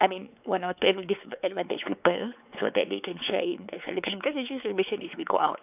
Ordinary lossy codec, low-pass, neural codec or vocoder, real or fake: none; 3.6 kHz; codec, 16 kHz, 4 kbps, X-Codec, WavLM features, trained on Multilingual LibriSpeech; fake